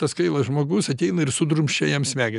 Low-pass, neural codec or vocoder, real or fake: 10.8 kHz; none; real